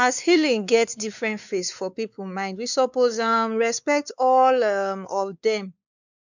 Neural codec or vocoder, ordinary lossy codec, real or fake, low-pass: codec, 16 kHz, 4 kbps, X-Codec, WavLM features, trained on Multilingual LibriSpeech; none; fake; 7.2 kHz